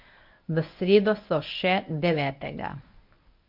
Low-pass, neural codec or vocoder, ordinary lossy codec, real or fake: 5.4 kHz; codec, 24 kHz, 0.9 kbps, WavTokenizer, medium speech release version 1; MP3, 48 kbps; fake